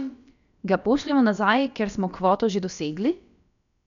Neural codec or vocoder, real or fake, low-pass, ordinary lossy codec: codec, 16 kHz, about 1 kbps, DyCAST, with the encoder's durations; fake; 7.2 kHz; none